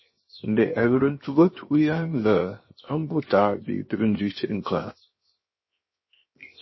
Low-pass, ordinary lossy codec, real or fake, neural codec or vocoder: 7.2 kHz; MP3, 24 kbps; fake; codec, 16 kHz, 0.7 kbps, FocalCodec